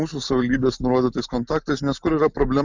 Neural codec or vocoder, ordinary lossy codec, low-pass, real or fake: none; Opus, 64 kbps; 7.2 kHz; real